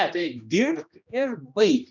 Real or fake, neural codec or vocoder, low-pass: fake; codec, 16 kHz, 1 kbps, X-Codec, HuBERT features, trained on general audio; 7.2 kHz